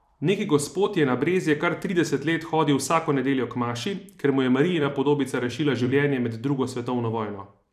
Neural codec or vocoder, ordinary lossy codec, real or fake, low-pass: vocoder, 44.1 kHz, 128 mel bands every 256 samples, BigVGAN v2; none; fake; 14.4 kHz